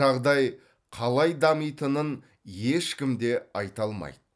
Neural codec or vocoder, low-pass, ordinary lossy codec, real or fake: none; none; none; real